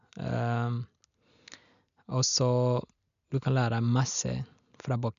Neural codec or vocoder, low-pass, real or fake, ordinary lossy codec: none; 7.2 kHz; real; none